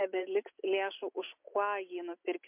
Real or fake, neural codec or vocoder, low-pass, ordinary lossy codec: real; none; 3.6 kHz; MP3, 32 kbps